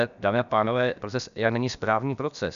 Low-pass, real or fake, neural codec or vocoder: 7.2 kHz; fake; codec, 16 kHz, about 1 kbps, DyCAST, with the encoder's durations